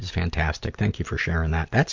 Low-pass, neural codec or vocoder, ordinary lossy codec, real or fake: 7.2 kHz; none; MP3, 64 kbps; real